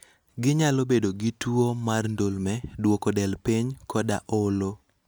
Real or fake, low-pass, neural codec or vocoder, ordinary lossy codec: real; none; none; none